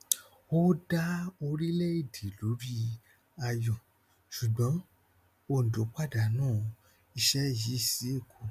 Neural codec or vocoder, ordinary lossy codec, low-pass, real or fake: none; none; 14.4 kHz; real